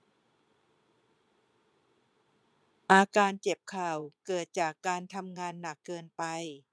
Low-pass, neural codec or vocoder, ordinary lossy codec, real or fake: none; vocoder, 22.05 kHz, 80 mel bands, Vocos; none; fake